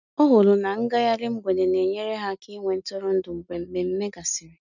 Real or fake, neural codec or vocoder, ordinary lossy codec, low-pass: real; none; none; 7.2 kHz